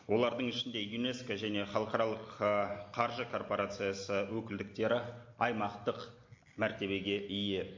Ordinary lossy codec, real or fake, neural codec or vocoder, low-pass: MP3, 48 kbps; real; none; 7.2 kHz